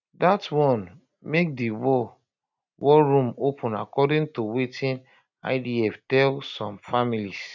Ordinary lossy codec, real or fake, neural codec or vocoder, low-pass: none; real; none; 7.2 kHz